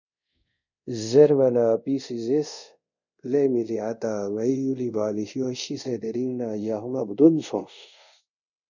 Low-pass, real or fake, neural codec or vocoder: 7.2 kHz; fake; codec, 24 kHz, 0.5 kbps, DualCodec